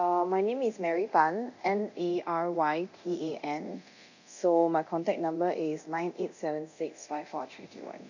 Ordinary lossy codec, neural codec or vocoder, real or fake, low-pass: none; codec, 24 kHz, 0.9 kbps, DualCodec; fake; 7.2 kHz